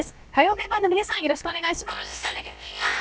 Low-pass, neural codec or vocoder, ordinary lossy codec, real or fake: none; codec, 16 kHz, about 1 kbps, DyCAST, with the encoder's durations; none; fake